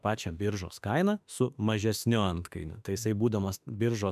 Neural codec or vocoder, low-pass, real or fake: autoencoder, 48 kHz, 32 numbers a frame, DAC-VAE, trained on Japanese speech; 14.4 kHz; fake